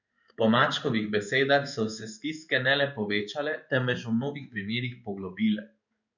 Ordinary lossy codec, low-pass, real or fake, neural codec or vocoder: none; 7.2 kHz; fake; codec, 16 kHz in and 24 kHz out, 1 kbps, XY-Tokenizer